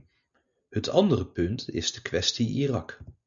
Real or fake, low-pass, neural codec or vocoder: real; 7.2 kHz; none